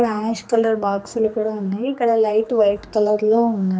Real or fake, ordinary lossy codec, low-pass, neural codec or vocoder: fake; none; none; codec, 16 kHz, 2 kbps, X-Codec, HuBERT features, trained on general audio